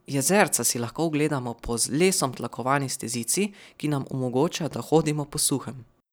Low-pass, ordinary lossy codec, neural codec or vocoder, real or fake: none; none; none; real